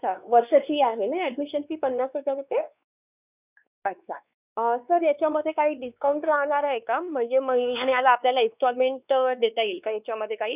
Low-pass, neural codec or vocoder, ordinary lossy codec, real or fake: 3.6 kHz; codec, 16 kHz, 2 kbps, X-Codec, WavLM features, trained on Multilingual LibriSpeech; none; fake